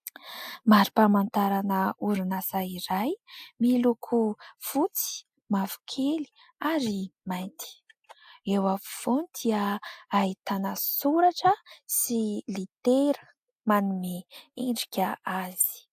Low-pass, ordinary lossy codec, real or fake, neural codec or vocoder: 14.4 kHz; AAC, 96 kbps; real; none